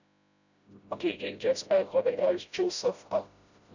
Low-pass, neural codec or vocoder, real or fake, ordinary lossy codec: 7.2 kHz; codec, 16 kHz, 0.5 kbps, FreqCodec, smaller model; fake; none